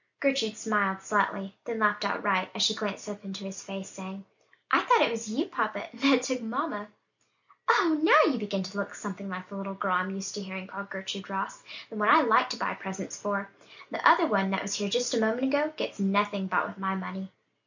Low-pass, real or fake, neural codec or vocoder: 7.2 kHz; real; none